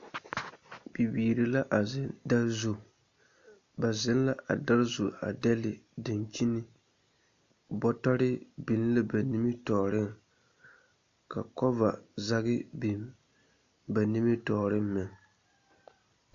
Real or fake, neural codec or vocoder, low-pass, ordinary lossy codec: real; none; 7.2 kHz; AAC, 48 kbps